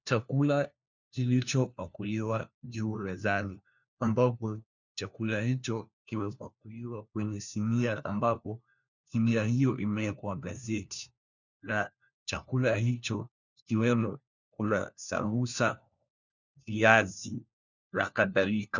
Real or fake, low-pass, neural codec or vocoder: fake; 7.2 kHz; codec, 16 kHz, 1 kbps, FunCodec, trained on LibriTTS, 50 frames a second